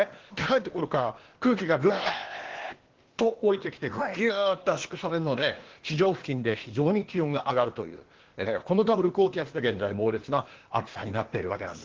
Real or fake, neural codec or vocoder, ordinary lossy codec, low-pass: fake; codec, 16 kHz, 0.8 kbps, ZipCodec; Opus, 16 kbps; 7.2 kHz